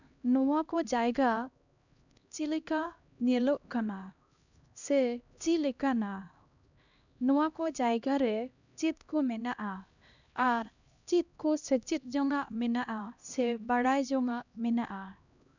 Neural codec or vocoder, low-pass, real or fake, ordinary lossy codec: codec, 16 kHz, 1 kbps, X-Codec, HuBERT features, trained on LibriSpeech; 7.2 kHz; fake; none